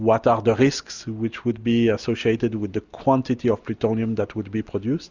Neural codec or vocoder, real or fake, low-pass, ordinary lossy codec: none; real; 7.2 kHz; Opus, 64 kbps